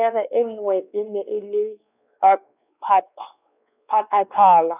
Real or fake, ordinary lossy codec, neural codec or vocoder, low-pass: fake; none; codec, 16 kHz, 2 kbps, X-Codec, WavLM features, trained on Multilingual LibriSpeech; 3.6 kHz